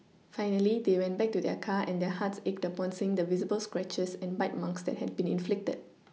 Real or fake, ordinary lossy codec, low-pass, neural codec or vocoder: real; none; none; none